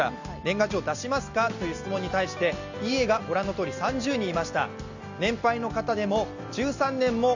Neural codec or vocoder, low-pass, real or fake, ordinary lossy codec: none; 7.2 kHz; real; Opus, 64 kbps